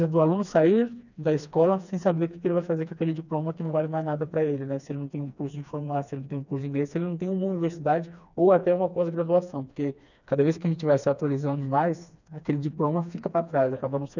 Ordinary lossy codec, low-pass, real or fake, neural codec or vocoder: none; 7.2 kHz; fake; codec, 16 kHz, 2 kbps, FreqCodec, smaller model